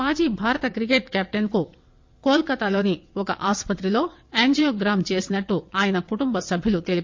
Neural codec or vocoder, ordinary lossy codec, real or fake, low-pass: vocoder, 22.05 kHz, 80 mel bands, WaveNeXt; MP3, 48 kbps; fake; 7.2 kHz